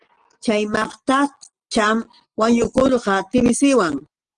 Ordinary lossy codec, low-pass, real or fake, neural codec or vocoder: Opus, 16 kbps; 9.9 kHz; real; none